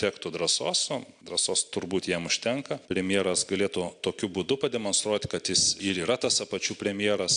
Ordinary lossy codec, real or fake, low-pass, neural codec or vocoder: AAC, 64 kbps; real; 9.9 kHz; none